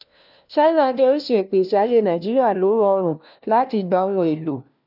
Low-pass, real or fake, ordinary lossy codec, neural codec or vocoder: 5.4 kHz; fake; none; codec, 16 kHz, 1 kbps, FunCodec, trained on LibriTTS, 50 frames a second